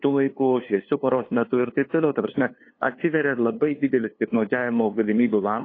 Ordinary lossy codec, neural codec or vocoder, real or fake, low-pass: AAC, 32 kbps; codec, 16 kHz, 2 kbps, FunCodec, trained on LibriTTS, 25 frames a second; fake; 7.2 kHz